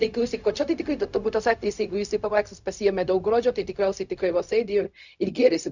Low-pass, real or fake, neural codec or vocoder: 7.2 kHz; fake; codec, 16 kHz, 0.4 kbps, LongCat-Audio-Codec